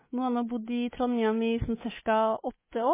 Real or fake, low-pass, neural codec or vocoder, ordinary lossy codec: fake; 3.6 kHz; codec, 24 kHz, 3.1 kbps, DualCodec; MP3, 16 kbps